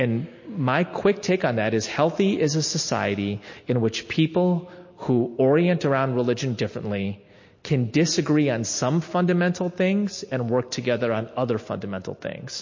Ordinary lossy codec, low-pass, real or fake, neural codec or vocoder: MP3, 32 kbps; 7.2 kHz; real; none